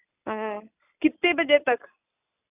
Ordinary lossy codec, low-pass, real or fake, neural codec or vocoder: none; 3.6 kHz; real; none